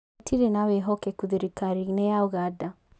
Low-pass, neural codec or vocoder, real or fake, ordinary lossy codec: none; none; real; none